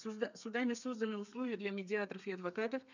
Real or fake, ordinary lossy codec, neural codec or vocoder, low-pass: fake; none; codec, 32 kHz, 1.9 kbps, SNAC; 7.2 kHz